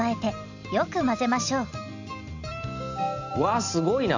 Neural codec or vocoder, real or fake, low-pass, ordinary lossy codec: none; real; 7.2 kHz; none